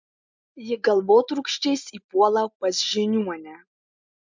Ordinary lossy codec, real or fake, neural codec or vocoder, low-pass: MP3, 64 kbps; real; none; 7.2 kHz